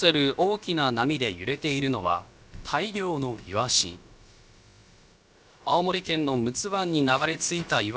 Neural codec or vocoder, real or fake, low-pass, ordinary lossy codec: codec, 16 kHz, about 1 kbps, DyCAST, with the encoder's durations; fake; none; none